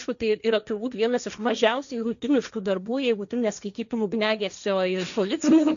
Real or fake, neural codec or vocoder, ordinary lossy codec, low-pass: fake; codec, 16 kHz, 1.1 kbps, Voila-Tokenizer; MP3, 96 kbps; 7.2 kHz